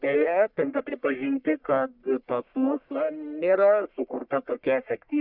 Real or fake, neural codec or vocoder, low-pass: fake; codec, 44.1 kHz, 1.7 kbps, Pupu-Codec; 5.4 kHz